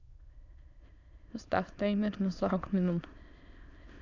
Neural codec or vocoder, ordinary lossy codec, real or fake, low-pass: autoencoder, 22.05 kHz, a latent of 192 numbers a frame, VITS, trained on many speakers; none; fake; 7.2 kHz